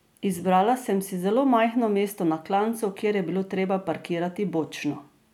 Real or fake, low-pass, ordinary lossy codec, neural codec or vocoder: real; 19.8 kHz; none; none